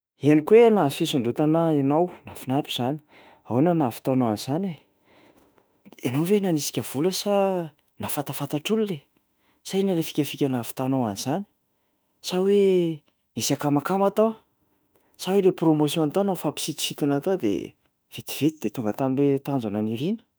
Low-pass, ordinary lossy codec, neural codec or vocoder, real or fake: none; none; autoencoder, 48 kHz, 32 numbers a frame, DAC-VAE, trained on Japanese speech; fake